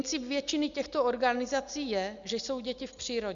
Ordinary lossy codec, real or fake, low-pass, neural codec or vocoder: Opus, 64 kbps; real; 7.2 kHz; none